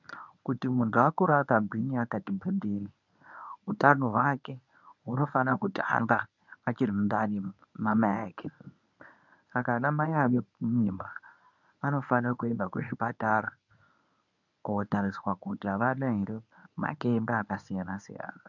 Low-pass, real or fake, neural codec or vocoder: 7.2 kHz; fake; codec, 24 kHz, 0.9 kbps, WavTokenizer, medium speech release version 1